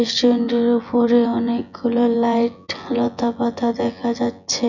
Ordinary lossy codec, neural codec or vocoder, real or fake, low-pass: none; vocoder, 24 kHz, 100 mel bands, Vocos; fake; 7.2 kHz